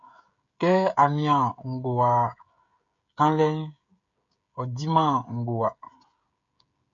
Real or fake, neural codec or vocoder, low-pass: fake; codec, 16 kHz, 16 kbps, FreqCodec, smaller model; 7.2 kHz